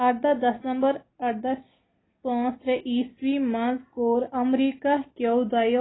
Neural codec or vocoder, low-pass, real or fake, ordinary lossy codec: none; 7.2 kHz; real; AAC, 16 kbps